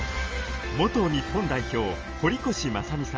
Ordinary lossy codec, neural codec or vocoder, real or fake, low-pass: Opus, 24 kbps; none; real; 7.2 kHz